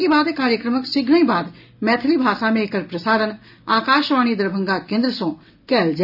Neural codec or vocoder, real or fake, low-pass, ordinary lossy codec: none; real; 5.4 kHz; none